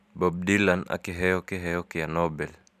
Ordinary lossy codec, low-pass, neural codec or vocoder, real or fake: none; 14.4 kHz; none; real